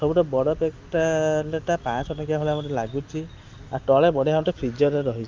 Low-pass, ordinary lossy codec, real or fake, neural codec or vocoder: 7.2 kHz; Opus, 32 kbps; real; none